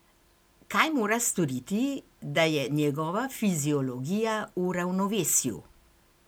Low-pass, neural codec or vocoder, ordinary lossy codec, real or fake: none; none; none; real